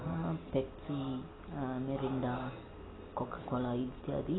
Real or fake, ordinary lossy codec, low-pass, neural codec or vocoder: real; AAC, 16 kbps; 7.2 kHz; none